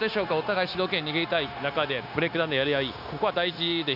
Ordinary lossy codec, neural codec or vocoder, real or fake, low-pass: none; codec, 16 kHz, 0.9 kbps, LongCat-Audio-Codec; fake; 5.4 kHz